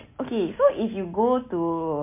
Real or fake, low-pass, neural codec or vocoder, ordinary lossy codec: real; 3.6 kHz; none; MP3, 24 kbps